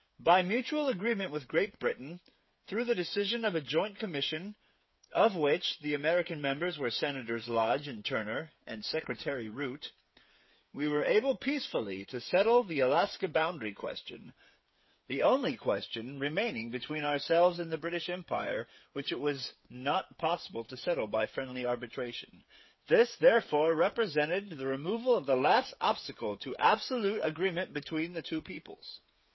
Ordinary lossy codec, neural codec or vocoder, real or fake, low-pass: MP3, 24 kbps; codec, 16 kHz, 8 kbps, FreqCodec, smaller model; fake; 7.2 kHz